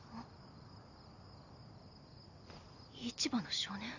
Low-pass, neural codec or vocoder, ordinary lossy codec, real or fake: 7.2 kHz; none; none; real